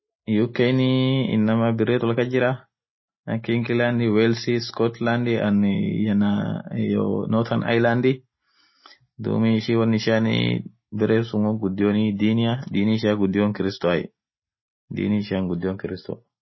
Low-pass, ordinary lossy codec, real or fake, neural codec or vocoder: 7.2 kHz; MP3, 24 kbps; real; none